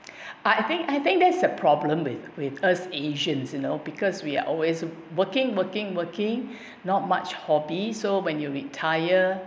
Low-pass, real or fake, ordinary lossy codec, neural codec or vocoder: none; real; none; none